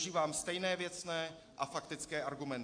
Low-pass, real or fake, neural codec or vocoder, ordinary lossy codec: 9.9 kHz; real; none; AAC, 48 kbps